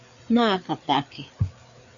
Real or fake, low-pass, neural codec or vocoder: fake; 7.2 kHz; codec, 16 kHz, 4 kbps, FreqCodec, larger model